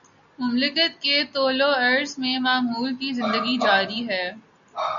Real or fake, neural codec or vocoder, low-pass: real; none; 7.2 kHz